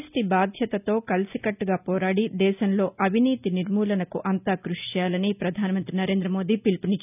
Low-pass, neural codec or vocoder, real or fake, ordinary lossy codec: 3.6 kHz; none; real; none